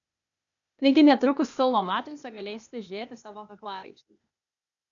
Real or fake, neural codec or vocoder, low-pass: fake; codec, 16 kHz, 0.8 kbps, ZipCodec; 7.2 kHz